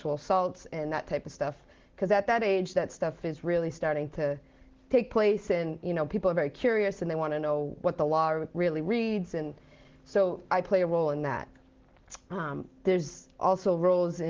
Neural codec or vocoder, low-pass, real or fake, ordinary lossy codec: none; 7.2 kHz; real; Opus, 16 kbps